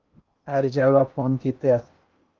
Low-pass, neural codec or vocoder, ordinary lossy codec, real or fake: 7.2 kHz; codec, 16 kHz in and 24 kHz out, 0.8 kbps, FocalCodec, streaming, 65536 codes; Opus, 24 kbps; fake